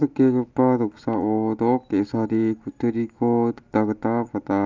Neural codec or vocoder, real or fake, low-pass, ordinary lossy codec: none; real; 7.2 kHz; Opus, 24 kbps